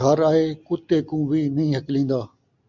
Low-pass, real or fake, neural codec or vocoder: 7.2 kHz; real; none